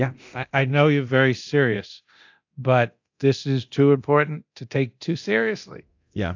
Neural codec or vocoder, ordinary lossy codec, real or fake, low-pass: codec, 24 kHz, 0.9 kbps, DualCodec; AAC, 48 kbps; fake; 7.2 kHz